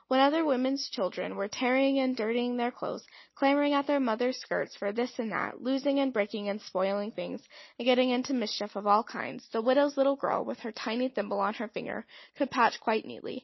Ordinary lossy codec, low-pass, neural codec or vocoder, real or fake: MP3, 24 kbps; 7.2 kHz; none; real